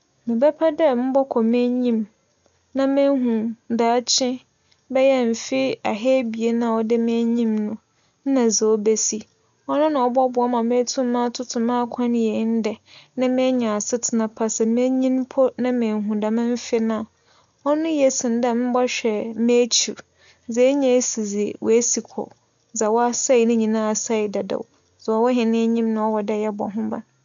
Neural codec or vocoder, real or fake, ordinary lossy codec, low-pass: none; real; none; 7.2 kHz